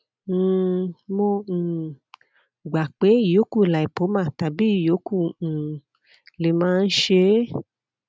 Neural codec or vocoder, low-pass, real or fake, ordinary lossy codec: none; none; real; none